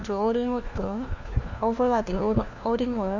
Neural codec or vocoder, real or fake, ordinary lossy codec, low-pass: codec, 16 kHz, 1 kbps, FunCodec, trained on LibriTTS, 50 frames a second; fake; none; 7.2 kHz